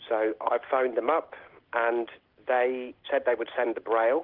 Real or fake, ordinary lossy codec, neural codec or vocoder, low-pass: real; Opus, 16 kbps; none; 5.4 kHz